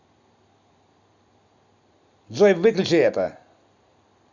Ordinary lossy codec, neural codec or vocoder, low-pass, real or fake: Opus, 64 kbps; none; 7.2 kHz; real